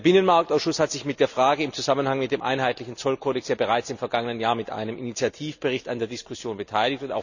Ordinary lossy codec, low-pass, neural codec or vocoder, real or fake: none; 7.2 kHz; none; real